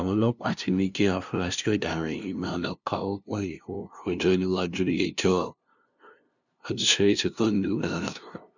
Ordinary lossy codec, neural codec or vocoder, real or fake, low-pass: none; codec, 16 kHz, 0.5 kbps, FunCodec, trained on LibriTTS, 25 frames a second; fake; 7.2 kHz